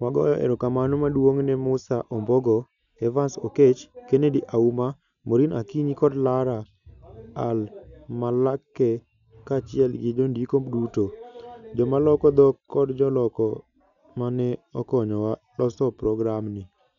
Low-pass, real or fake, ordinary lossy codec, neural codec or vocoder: 7.2 kHz; real; none; none